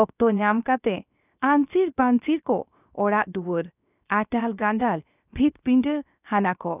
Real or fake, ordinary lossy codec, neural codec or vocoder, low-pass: fake; none; codec, 16 kHz, 0.7 kbps, FocalCodec; 3.6 kHz